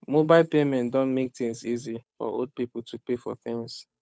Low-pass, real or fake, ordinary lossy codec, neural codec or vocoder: none; fake; none; codec, 16 kHz, 8 kbps, FunCodec, trained on Chinese and English, 25 frames a second